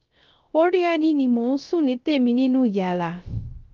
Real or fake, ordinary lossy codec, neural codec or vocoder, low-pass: fake; Opus, 24 kbps; codec, 16 kHz, 0.3 kbps, FocalCodec; 7.2 kHz